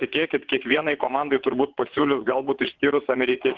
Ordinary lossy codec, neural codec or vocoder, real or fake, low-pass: Opus, 16 kbps; vocoder, 22.05 kHz, 80 mel bands, WaveNeXt; fake; 7.2 kHz